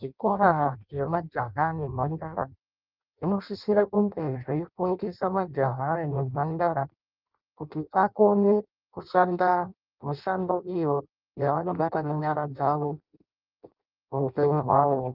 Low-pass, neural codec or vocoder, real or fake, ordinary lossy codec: 5.4 kHz; codec, 16 kHz in and 24 kHz out, 0.6 kbps, FireRedTTS-2 codec; fake; Opus, 24 kbps